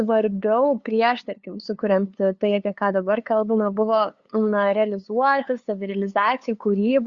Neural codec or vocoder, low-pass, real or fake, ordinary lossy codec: codec, 16 kHz, 2 kbps, FunCodec, trained on LibriTTS, 25 frames a second; 7.2 kHz; fake; Opus, 64 kbps